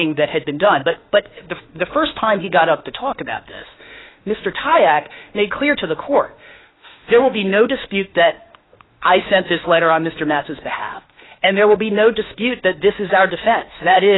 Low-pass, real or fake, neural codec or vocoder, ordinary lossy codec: 7.2 kHz; fake; autoencoder, 48 kHz, 32 numbers a frame, DAC-VAE, trained on Japanese speech; AAC, 16 kbps